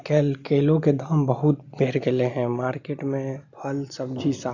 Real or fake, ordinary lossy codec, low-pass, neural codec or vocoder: real; none; 7.2 kHz; none